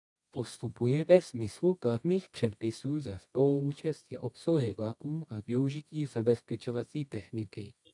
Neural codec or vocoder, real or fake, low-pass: codec, 24 kHz, 0.9 kbps, WavTokenizer, medium music audio release; fake; 10.8 kHz